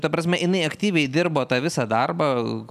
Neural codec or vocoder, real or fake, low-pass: none; real; 14.4 kHz